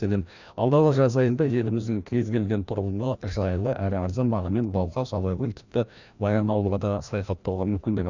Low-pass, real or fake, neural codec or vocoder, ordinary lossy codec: 7.2 kHz; fake; codec, 16 kHz, 1 kbps, FreqCodec, larger model; none